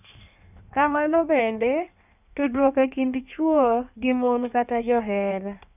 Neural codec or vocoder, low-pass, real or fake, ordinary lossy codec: codec, 16 kHz in and 24 kHz out, 1.1 kbps, FireRedTTS-2 codec; 3.6 kHz; fake; none